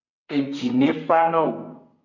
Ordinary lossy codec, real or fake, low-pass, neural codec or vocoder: MP3, 64 kbps; fake; 7.2 kHz; codec, 32 kHz, 1.9 kbps, SNAC